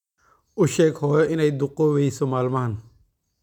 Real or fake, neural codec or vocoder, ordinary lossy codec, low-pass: real; none; none; 19.8 kHz